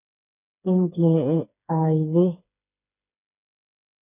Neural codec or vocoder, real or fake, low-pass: codec, 16 kHz, 4 kbps, FreqCodec, smaller model; fake; 3.6 kHz